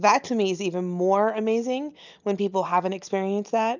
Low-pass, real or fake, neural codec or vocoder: 7.2 kHz; real; none